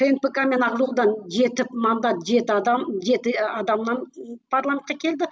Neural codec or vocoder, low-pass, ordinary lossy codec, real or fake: none; none; none; real